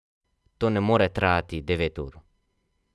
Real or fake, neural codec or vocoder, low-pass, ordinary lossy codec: real; none; none; none